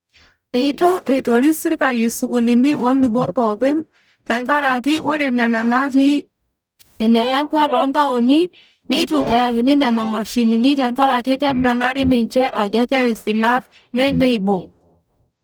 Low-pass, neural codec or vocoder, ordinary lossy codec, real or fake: none; codec, 44.1 kHz, 0.9 kbps, DAC; none; fake